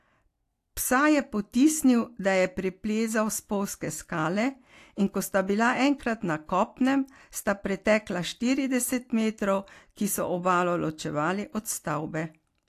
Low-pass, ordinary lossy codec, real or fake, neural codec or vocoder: 14.4 kHz; AAC, 64 kbps; real; none